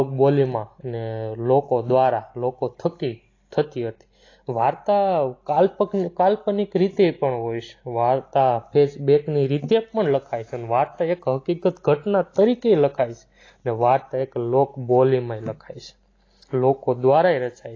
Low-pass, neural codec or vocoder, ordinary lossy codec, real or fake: 7.2 kHz; none; AAC, 32 kbps; real